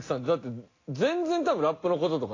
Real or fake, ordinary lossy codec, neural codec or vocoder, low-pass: real; AAC, 32 kbps; none; 7.2 kHz